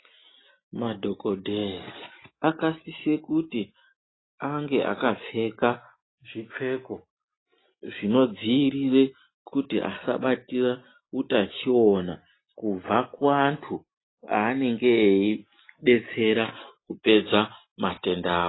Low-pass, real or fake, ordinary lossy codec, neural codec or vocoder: 7.2 kHz; real; AAC, 16 kbps; none